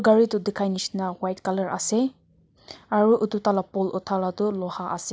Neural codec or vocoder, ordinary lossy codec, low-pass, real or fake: none; none; none; real